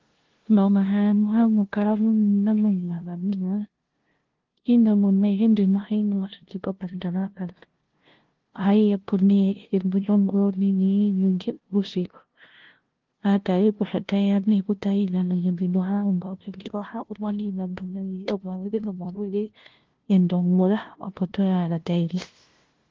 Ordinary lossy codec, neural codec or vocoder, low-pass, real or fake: Opus, 16 kbps; codec, 16 kHz, 0.5 kbps, FunCodec, trained on LibriTTS, 25 frames a second; 7.2 kHz; fake